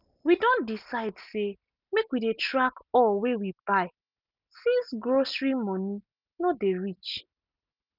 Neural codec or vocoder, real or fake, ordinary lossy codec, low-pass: none; real; none; 5.4 kHz